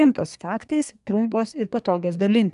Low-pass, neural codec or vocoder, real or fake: 10.8 kHz; codec, 24 kHz, 1 kbps, SNAC; fake